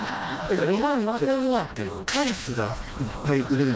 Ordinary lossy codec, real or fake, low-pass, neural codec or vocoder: none; fake; none; codec, 16 kHz, 1 kbps, FreqCodec, smaller model